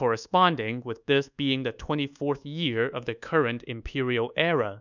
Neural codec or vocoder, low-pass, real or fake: codec, 24 kHz, 1.2 kbps, DualCodec; 7.2 kHz; fake